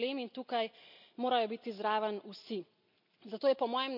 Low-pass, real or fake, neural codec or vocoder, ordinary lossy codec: 5.4 kHz; real; none; none